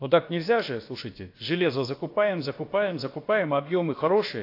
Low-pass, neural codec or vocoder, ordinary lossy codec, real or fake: 5.4 kHz; codec, 16 kHz, about 1 kbps, DyCAST, with the encoder's durations; AAC, 32 kbps; fake